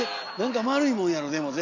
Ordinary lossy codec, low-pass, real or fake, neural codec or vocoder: Opus, 64 kbps; 7.2 kHz; real; none